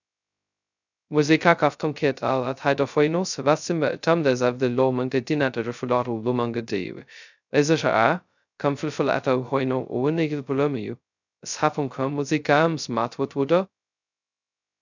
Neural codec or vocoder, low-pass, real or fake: codec, 16 kHz, 0.2 kbps, FocalCodec; 7.2 kHz; fake